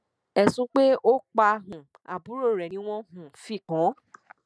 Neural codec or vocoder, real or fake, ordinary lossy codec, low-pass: none; real; none; none